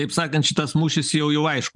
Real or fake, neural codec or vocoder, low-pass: real; none; 10.8 kHz